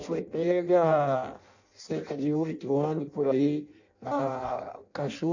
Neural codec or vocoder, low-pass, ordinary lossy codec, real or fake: codec, 16 kHz in and 24 kHz out, 0.6 kbps, FireRedTTS-2 codec; 7.2 kHz; none; fake